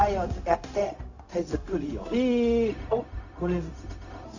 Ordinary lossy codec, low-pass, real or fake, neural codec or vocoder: none; 7.2 kHz; fake; codec, 16 kHz, 0.4 kbps, LongCat-Audio-Codec